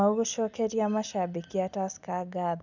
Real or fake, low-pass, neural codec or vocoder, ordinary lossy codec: real; 7.2 kHz; none; none